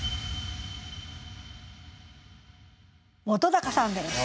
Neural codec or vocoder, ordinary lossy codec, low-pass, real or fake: none; none; none; real